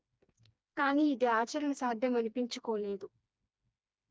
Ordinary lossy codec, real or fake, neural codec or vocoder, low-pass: none; fake; codec, 16 kHz, 2 kbps, FreqCodec, smaller model; none